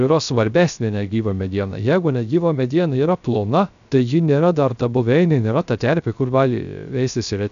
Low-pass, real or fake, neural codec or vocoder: 7.2 kHz; fake; codec, 16 kHz, 0.3 kbps, FocalCodec